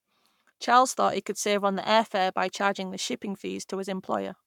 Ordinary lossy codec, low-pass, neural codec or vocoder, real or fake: none; 19.8 kHz; codec, 44.1 kHz, 7.8 kbps, Pupu-Codec; fake